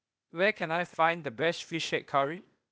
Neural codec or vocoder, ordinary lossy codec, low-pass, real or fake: codec, 16 kHz, 0.8 kbps, ZipCodec; none; none; fake